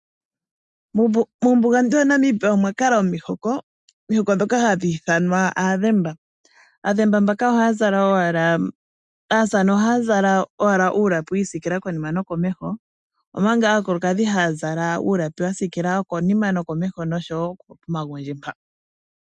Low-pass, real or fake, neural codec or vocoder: 10.8 kHz; real; none